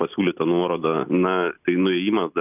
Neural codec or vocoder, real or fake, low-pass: none; real; 3.6 kHz